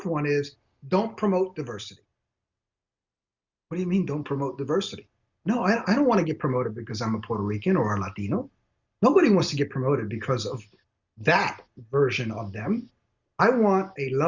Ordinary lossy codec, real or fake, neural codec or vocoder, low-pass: Opus, 64 kbps; real; none; 7.2 kHz